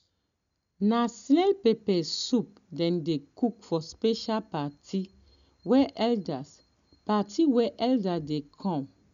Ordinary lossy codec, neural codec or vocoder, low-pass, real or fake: none; none; 7.2 kHz; real